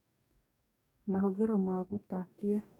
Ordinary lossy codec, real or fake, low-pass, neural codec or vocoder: none; fake; 19.8 kHz; codec, 44.1 kHz, 2.6 kbps, DAC